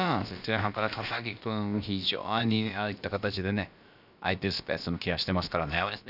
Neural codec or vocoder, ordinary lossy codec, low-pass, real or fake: codec, 16 kHz, about 1 kbps, DyCAST, with the encoder's durations; none; 5.4 kHz; fake